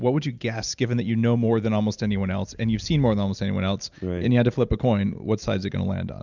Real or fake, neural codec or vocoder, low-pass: real; none; 7.2 kHz